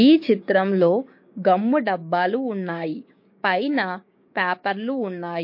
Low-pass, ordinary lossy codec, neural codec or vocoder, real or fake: 5.4 kHz; MP3, 32 kbps; codec, 16 kHz, 6 kbps, DAC; fake